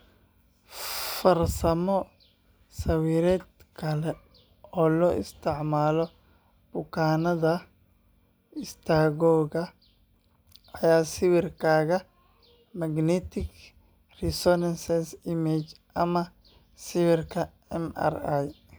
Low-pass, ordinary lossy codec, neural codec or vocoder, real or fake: none; none; none; real